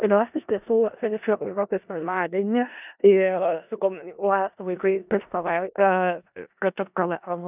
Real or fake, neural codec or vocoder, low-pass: fake; codec, 16 kHz in and 24 kHz out, 0.4 kbps, LongCat-Audio-Codec, four codebook decoder; 3.6 kHz